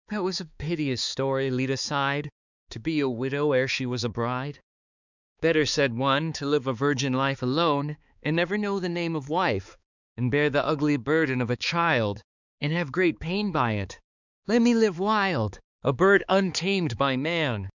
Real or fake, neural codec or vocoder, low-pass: fake; codec, 16 kHz, 4 kbps, X-Codec, HuBERT features, trained on balanced general audio; 7.2 kHz